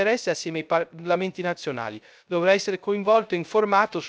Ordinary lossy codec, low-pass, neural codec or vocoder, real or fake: none; none; codec, 16 kHz, 0.3 kbps, FocalCodec; fake